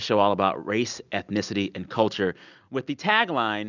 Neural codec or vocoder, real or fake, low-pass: none; real; 7.2 kHz